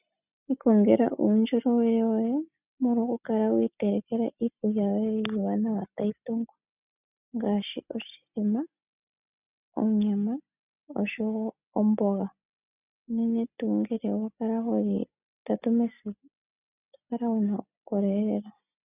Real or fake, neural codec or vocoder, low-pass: real; none; 3.6 kHz